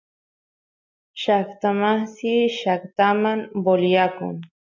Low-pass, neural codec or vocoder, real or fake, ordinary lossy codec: 7.2 kHz; none; real; MP3, 48 kbps